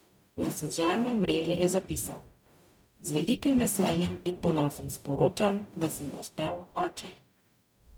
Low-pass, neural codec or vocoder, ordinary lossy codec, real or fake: none; codec, 44.1 kHz, 0.9 kbps, DAC; none; fake